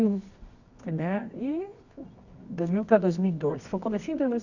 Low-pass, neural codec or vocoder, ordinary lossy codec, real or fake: 7.2 kHz; codec, 24 kHz, 0.9 kbps, WavTokenizer, medium music audio release; none; fake